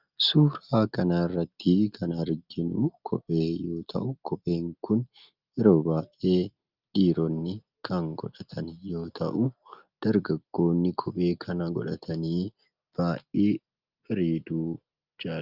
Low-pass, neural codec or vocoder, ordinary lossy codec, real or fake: 5.4 kHz; none; Opus, 24 kbps; real